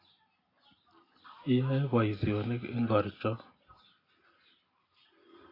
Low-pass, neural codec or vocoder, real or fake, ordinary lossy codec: 5.4 kHz; none; real; AAC, 24 kbps